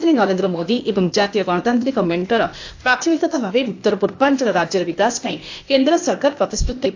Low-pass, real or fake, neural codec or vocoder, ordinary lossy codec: 7.2 kHz; fake; codec, 16 kHz, 0.8 kbps, ZipCodec; AAC, 48 kbps